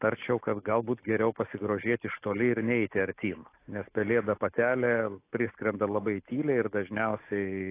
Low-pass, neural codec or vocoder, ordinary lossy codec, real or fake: 3.6 kHz; none; AAC, 24 kbps; real